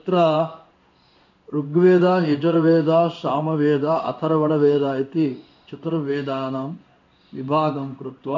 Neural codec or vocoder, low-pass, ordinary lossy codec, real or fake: codec, 16 kHz in and 24 kHz out, 1 kbps, XY-Tokenizer; 7.2 kHz; none; fake